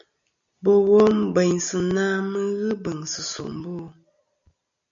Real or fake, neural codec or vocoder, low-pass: real; none; 7.2 kHz